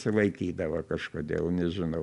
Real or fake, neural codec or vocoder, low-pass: real; none; 10.8 kHz